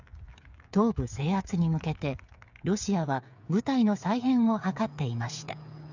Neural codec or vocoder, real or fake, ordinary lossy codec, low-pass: codec, 16 kHz, 8 kbps, FreqCodec, smaller model; fake; none; 7.2 kHz